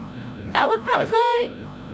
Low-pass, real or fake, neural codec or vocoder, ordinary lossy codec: none; fake; codec, 16 kHz, 0.5 kbps, FreqCodec, larger model; none